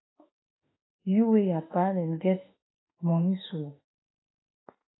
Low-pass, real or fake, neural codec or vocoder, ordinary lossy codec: 7.2 kHz; fake; autoencoder, 48 kHz, 32 numbers a frame, DAC-VAE, trained on Japanese speech; AAC, 16 kbps